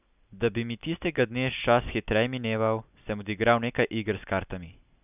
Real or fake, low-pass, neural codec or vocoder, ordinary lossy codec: real; 3.6 kHz; none; none